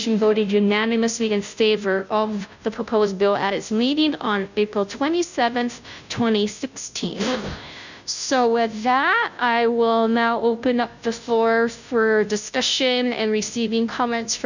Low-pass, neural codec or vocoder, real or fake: 7.2 kHz; codec, 16 kHz, 0.5 kbps, FunCodec, trained on Chinese and English, 25 frames a second; fake